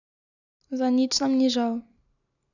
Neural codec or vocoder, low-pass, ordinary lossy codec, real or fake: none; 7.2 kHz; none; real